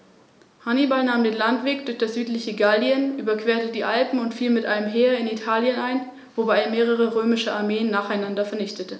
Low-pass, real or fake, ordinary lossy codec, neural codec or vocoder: none; real; none; none